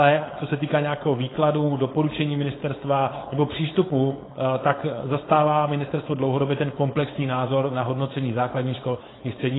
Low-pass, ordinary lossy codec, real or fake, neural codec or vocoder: 7.2 kHz; AAC, 16 kbps; fake; codec, 16 kHz, 4.8 kbps, FACodec